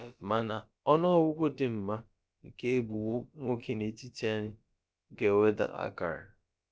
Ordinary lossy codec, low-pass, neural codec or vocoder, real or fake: none; none; codec, 16 kHz, about 1 kbps, DyCAST, with the encoder's durations; fake